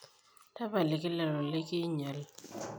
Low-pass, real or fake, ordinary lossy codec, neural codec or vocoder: none; real; none; none